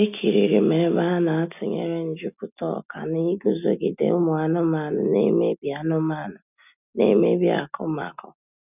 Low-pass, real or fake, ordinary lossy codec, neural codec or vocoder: 3.6 kHz; real; none; none